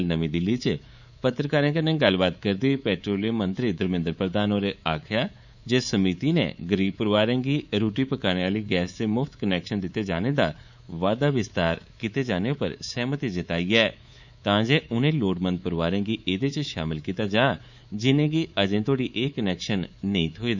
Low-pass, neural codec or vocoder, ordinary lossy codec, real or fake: 7.2 kHz; codec, 24 kHz, 3.1 kbps, DualCodec; none; fake